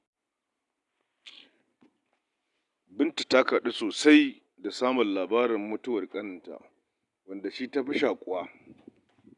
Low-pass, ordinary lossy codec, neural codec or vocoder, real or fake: 10.8 kHz; none; none; real